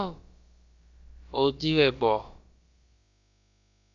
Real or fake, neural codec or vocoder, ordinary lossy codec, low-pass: fake; codec, 16 kHz, about 1 kbps, DyCAST, with the encoder's durations; Opus, 64 kbps; 7.2 kHz